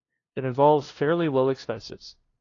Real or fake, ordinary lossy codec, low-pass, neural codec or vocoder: fake; AAC, 32 kbps; 7.2 kHz; codec, 16 kHz, 0.5 kbps, FunCodec, trained on LibriTTS, 25 frames a second